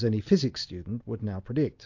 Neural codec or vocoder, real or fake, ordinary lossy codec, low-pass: none; real; AAC, 48 kbps; 7.2 kHz